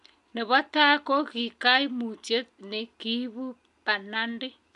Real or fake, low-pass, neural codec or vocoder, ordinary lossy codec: real; 10.8 kHz; none; none